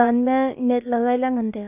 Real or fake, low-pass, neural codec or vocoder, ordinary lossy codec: fake; 3.6 kHz; codec, 16 kHz, about 1 kbps, DyCAST, with the encoder's durations; none